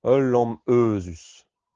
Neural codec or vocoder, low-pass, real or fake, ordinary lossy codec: none; 7.2 kHz; real; Opus, 16 kbps